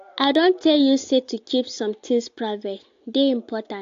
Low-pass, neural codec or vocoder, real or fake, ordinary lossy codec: 7.2 kHz; none; real; AAC, 48 kbps